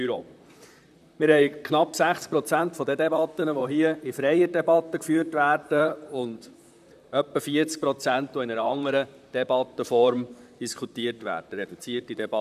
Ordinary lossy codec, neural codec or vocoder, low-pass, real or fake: none; vocoder, 44.1 kHz, 128 mel bands, Pupu-Vocoder; 14.4 kHz; fake